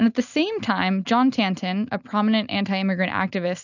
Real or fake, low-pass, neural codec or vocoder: real; 7.2 kHz; none